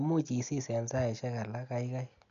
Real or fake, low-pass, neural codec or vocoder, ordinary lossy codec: real; 7.2 kHz; none; none